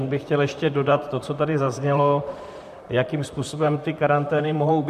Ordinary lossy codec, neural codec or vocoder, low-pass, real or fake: MP3, 96 kbps; vocoder, 44.1 kHz, 128 mel bands, Pupu-Vocoder; 14.4 kHz; fake